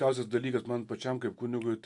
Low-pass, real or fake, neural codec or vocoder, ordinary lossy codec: 10.8 kHz; real; none; MP3, 64 kbps